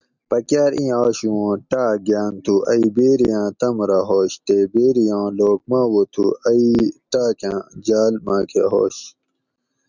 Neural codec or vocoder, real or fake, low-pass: none; real; 7.2 kHz